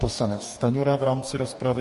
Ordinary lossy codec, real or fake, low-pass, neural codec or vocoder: MP3, 48 kbps; fake; 14.4 kHz; codec, 44.1 kHz, 2.6 kbps, DAC